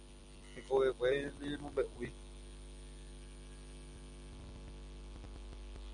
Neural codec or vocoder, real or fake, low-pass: none; real; 9.9 kHz